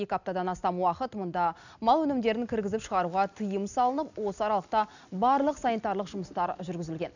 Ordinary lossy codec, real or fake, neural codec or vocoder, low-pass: none; real; none; 7.2 kHz